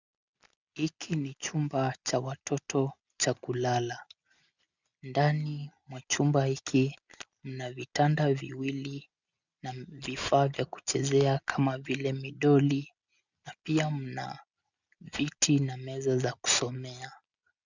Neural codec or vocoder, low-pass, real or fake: none; 7.2 kHz; real